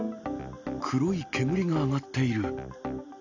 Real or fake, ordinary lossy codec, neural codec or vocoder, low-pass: real; none; none; 7.2 kHz